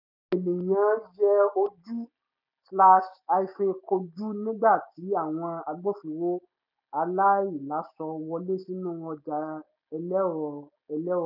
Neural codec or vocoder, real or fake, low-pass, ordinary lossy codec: none; real; 5.4 kHz; none